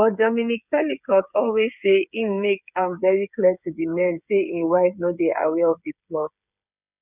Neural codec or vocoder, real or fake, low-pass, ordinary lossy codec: codec, 16 kHz, 8 kbps, FreqCodec, smaller model; fake; 3.6 kHz; none